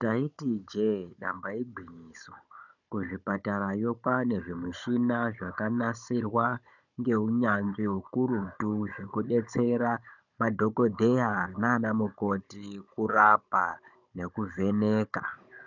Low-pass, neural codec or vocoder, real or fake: 7.2 kHz; codec, 16 kHz, 16 kbps, FunCodec, trained on Chinese and English, 50 frames a second; fake